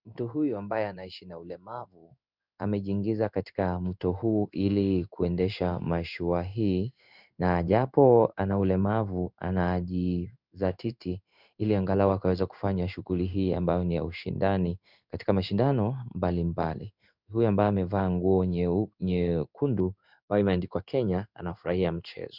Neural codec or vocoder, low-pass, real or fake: codec, 16 kHz in and 24 kHz out, 1 kbps, XY-Tokenizer; 5.4 kHz; fake